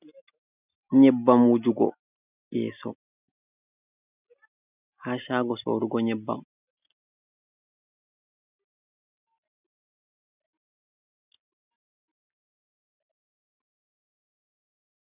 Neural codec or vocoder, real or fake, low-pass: none; real; 3.6 kHz